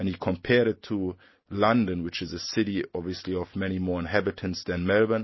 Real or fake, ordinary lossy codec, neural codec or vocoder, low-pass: real; MP3, 24 kbps; none; 7.2 kHz